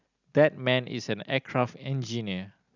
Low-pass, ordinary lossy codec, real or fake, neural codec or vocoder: 7.2 kHz; none; real; none